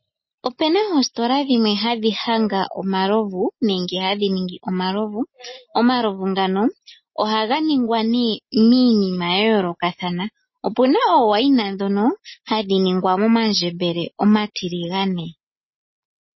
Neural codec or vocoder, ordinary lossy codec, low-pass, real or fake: none; MP3, 24 kbps; 7.2 kHz; real